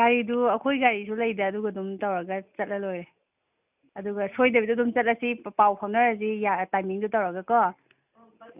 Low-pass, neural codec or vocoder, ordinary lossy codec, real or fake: 3.6 kHz; none; none; real